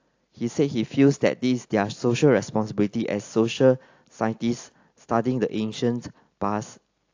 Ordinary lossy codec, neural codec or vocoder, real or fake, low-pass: AAC, 48 kbps; none; real; 7.2 kHz